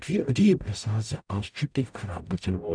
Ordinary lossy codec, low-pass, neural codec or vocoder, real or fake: none; 9.9 kHz; codec, 44.1 kHz, 0.9 kbps, DAC; fake